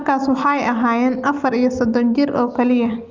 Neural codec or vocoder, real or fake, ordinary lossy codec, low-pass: none; real; Opus, 24 kbps; 7.2 kHz